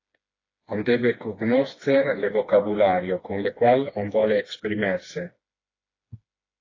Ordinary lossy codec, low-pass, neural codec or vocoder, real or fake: AAC, 32 kbps; 7.2 kHz; codec, 16 kHz, 2 kbps, FreqCodec, smaller model; fake